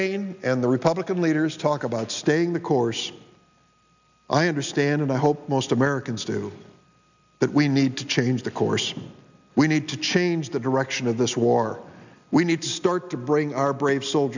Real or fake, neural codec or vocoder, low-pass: real; none; 7.2 kHz